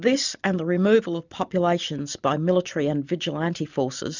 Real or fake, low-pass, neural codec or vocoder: real; 7.2 kHz; none